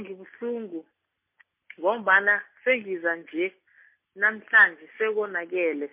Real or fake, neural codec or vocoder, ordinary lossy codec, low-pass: real; none; MP3, 24 kbps; 3.6 kHz